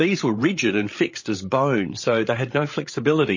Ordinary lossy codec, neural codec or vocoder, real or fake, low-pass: MP3, 32 kbps; codec, 16 kHz, 16 kbps, FreqCodec, larger model; fake; 7.2 kHz